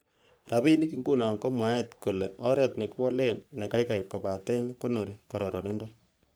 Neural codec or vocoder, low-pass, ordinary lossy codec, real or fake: codec, 44.1 kHz, 3.4 kbps, Pupu-Codec; none; none; fake